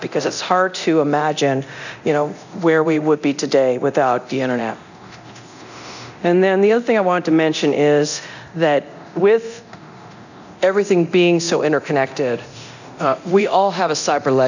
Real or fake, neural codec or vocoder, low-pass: fake; codec, 24 kHz, 0.9 kbps, DualCodec; 7.2 kHz